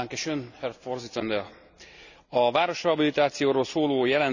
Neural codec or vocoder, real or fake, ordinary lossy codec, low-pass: none; real; none; 7.2 kHz